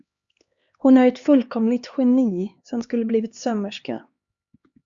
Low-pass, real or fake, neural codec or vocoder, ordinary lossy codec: 7.2 kHz; fake; codec, 16 kHz, 2 kbps, X-Codec, HuBERT features, trained on LibriSpeech; Opus, 64 kbps